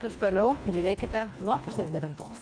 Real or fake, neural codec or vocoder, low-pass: fake; codec, 24 kHz, 1.5 kbps, HILCodec; 9.9 kHz